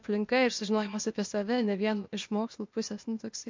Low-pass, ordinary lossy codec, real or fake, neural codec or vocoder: 7.2 kHz; MP3, 48 kbps; fake; codec, 16 kHz, 0.8 kbps, ZipCodec